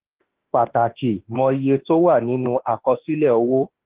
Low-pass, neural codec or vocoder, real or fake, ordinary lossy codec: 3.6 kHz; autoencoder, 48 kHz, 32 numbers a frame, DAC-VAE, trained on Japanese speech; fake; Opus, 16 kbps